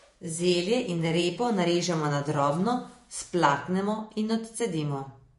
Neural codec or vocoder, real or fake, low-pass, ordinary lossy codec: vocoder, 48 kHz, 128 mel bands, Vocos; fake; 14.4 kHz; MP3, 48 kbps